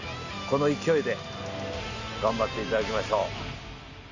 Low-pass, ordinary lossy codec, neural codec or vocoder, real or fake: 7.2 kHz; AAC, 48 kbps; none; real